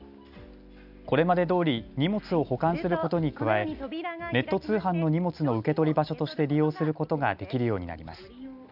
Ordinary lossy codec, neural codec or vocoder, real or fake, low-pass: none; none; real; 5.4 kHz